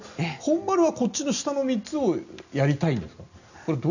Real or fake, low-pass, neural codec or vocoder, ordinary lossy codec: real; 7.2 kHz; none; none